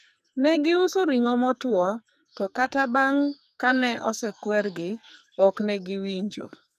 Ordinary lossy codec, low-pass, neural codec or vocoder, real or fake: none; 14.4 kHz; codec, 44.1 kHz, 2.6 kbps, SNAC; fake